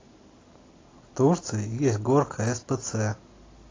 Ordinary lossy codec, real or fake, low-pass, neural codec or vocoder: AAC, 32 kbps; real; 7.2 kHz; none